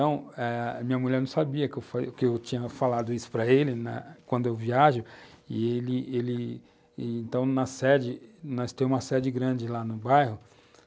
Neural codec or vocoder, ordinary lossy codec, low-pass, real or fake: none; none; none; real